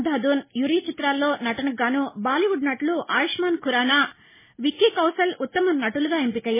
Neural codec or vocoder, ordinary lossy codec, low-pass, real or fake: none; MP3, 16 kbps; 3.6 kHz; real